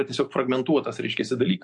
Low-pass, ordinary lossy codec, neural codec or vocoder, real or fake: 10.8 kHz; MP3, 64 kbps; none; real